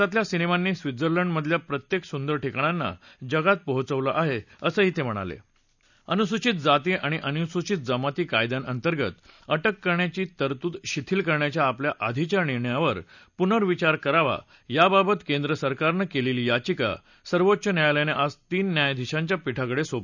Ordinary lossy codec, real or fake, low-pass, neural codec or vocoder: none; real; 7.2 kHz; none